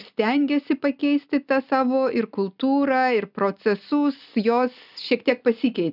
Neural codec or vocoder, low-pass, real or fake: none; 5.4 kHz; real